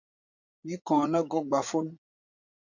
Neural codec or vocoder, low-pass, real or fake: vocoder, 44.1 kHz, 80 mel bands, Vocos; 7.2 kHz; fake